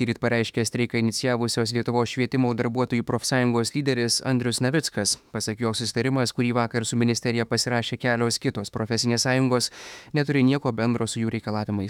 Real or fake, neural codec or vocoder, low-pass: fake; autoencoder, 48 kHz, 32 numbers a frame, DAC-VAE, trained on Japanese speech; 19.8 kHz